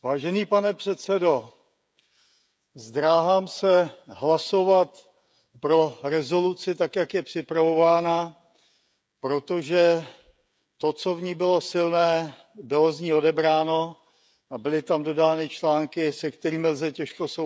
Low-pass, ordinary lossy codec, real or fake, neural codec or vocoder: none; none; fake; codec, 16 kHz, 16 kbps, FreqCodec, smaller model